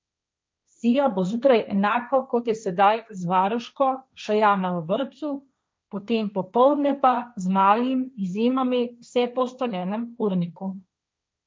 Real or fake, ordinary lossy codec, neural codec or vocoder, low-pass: fake; none; codec, 16 kHz, 1.1 kbps, Voila-Tokenizer; none